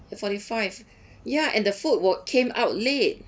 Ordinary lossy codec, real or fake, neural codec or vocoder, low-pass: none; real; none; none